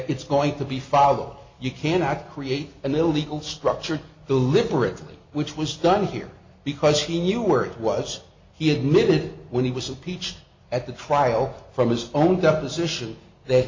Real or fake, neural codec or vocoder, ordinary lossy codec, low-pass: real; none; MP3, 48 kbps; 7.2 kHz